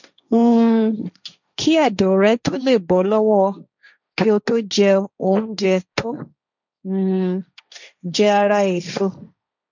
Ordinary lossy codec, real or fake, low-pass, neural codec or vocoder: none; fake; 7.2 kHz; codec, 16 kHz, 1.1 kbps, Voila-Tokenizer